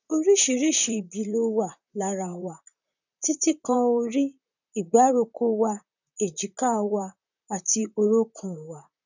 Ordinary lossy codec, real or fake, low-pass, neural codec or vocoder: none; fake; 7.2 kHz; vocoder, 44.1 kHz, 128 mel bands, Pupu-Vocoder